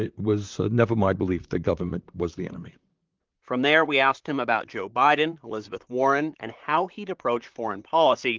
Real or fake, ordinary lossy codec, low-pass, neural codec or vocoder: fake; Opus, 32 kbps; 7.2 kHz; vocoder, 44.1 kHz, 128 mel bands, Pupu-Vocoder